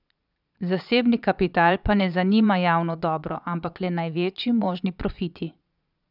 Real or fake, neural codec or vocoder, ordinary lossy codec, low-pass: fake; vocoder, 22.05 kHz, 80 mel bands, Vocos; none; 5.4 kHz